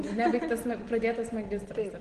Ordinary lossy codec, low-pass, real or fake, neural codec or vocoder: Opus, 16 kbps; 9.9 kHz; real; none